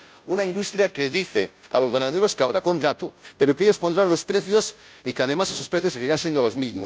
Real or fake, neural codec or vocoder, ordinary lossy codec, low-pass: fake; codec, 16 kHz, 0.5 kbps, FunCodec, trained on Chinese and English, 25 frames a second; none; none